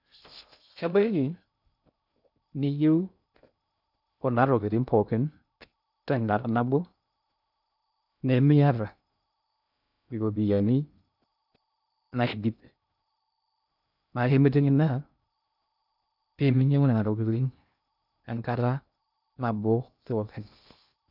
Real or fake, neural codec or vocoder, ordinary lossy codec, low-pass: fake; codec, 16 kHz in and 24 kHz out, 0.6 kbps, FocalCodec, streaming, 2048 codes; none; 5.4 kHz